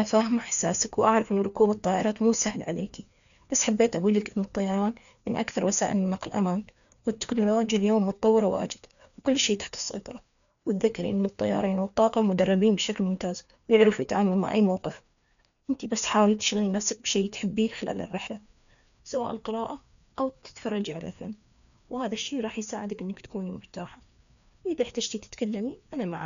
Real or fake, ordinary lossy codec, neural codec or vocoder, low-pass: fake; none; codec, 16 kHz, 2 kbps, FreqCodec, larger model; 7.2 kHz